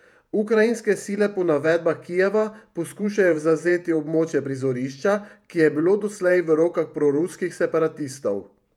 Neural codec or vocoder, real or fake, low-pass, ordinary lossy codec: vocoder, 48 kHz, 128 mel bands, Vocos; fake; 19.8 kHz; none